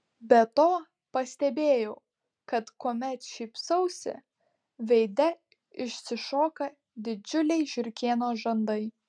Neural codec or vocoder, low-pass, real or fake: none; 9.9 kHz; real